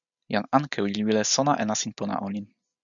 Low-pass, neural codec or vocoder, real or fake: 7.2 kHz; none; real